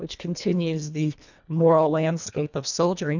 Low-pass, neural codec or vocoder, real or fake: 7.2 kHz; codec, 24 kHz, 1.5 kbps, HILCodec; fake